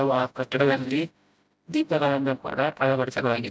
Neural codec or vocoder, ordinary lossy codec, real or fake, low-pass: codec, 16 kHz, 0.5 kbps, FreqCodec, smaller model; none; fake; none